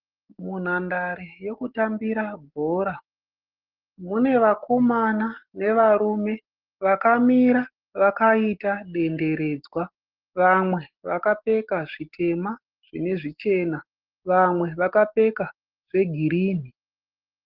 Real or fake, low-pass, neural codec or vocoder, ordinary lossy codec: real; 5.4 kHz; none; Opus, 16 kbps